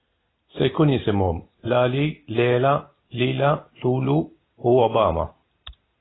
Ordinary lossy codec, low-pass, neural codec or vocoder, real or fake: AAC, 16 kbps; 7.2 kHz; none; real